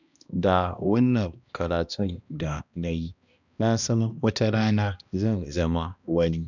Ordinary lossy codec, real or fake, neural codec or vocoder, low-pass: none; fake; codec, 16 kHz, 1 kbps, X-Codec, HuBERT features, trained on balanced general audio; 7.2 kHz